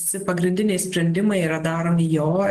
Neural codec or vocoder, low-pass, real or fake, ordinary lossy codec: none; 14.4 kHz; real; Opus, 16 kbps